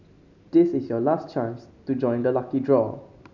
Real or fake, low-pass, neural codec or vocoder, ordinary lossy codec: real; 7.2 kHz; none; none